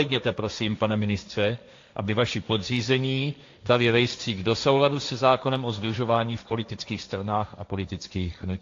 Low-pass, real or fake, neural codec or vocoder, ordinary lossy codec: 7.2 kHz; fake; codec, 16 kHz, 1.1 kbps, Voila-Tokenizer; AAC, 48 kbps